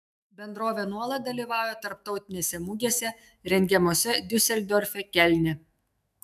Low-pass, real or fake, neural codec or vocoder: 14.4 kHz; fake; autoencoder, 48 kHz, 128 numbers a frame, DAC-VAE, trained on Japanese speech